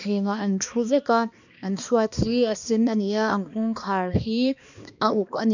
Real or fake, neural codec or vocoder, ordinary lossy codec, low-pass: fake; codec, 16 kHz, 2 kbps, X-Codec, HuBERT features, trained on balanced general audio; none; 7.2 kHz